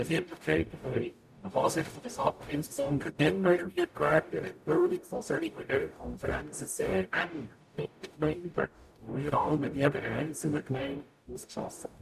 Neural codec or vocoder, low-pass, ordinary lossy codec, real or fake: codec, 44.1 kHz, 0.9 kbps, DAC; 14.4 kHz; none; fake